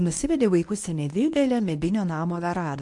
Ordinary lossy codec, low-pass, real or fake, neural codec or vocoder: AAC, 64 kbps; 10.8 kHz; fake; codec, 24 kHz, 0.9 kbps, WavTokenizer, medium speech release version 1